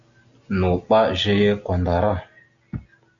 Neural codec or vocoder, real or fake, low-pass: none; real; 7.2 kHz